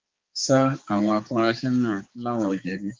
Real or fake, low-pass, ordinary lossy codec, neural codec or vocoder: fake; 7.2 kHz; Opus, 32 kbps; codec, 16 kHz, 4 kbps, X-Codec, HuBERT features, trained on balanced general audio